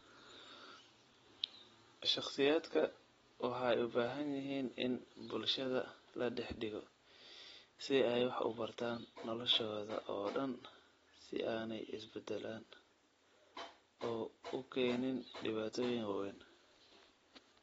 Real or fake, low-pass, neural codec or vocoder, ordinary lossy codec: real; 19.8 kHz; none; AAC, 24 kbps